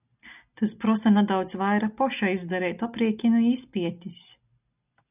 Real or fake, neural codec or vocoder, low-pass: real; none; 3.6 kHz